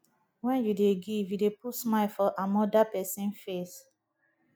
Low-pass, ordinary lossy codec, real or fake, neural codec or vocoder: none; none; real; none